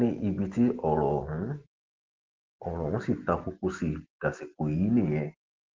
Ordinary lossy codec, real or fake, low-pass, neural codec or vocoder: Opus, 16 kbps; real; 7.2 kHz; none